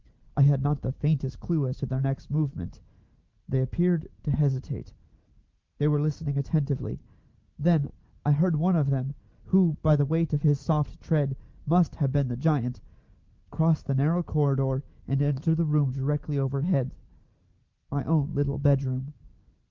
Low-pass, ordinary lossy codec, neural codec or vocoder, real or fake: 7.2 kHz; Opus, 32 kbps; none; real